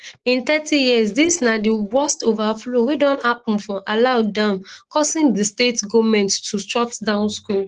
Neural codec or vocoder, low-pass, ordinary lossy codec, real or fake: autoencoder, 48 kHz, 128 numbers a frame, DAC-VAE, trained on Japanese speech; 10.8 kHz; Opus, 16 kbps; fake